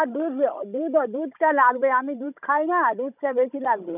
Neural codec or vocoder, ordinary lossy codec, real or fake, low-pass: codec, 16 kHz, 16 kbps, FunCodec, trained on Chinese and English, 50 frames a second; none; fake; 3.6 kHz